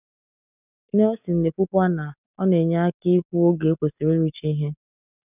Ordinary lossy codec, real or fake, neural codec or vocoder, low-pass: none; real; none; 3.6 kHz